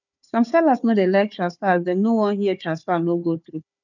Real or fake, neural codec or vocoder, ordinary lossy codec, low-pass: fake; codec, 16 kHz, 4 kbps, FunCodec, trained on Chinese and English, 50 frames a second; none; 7.2 kHz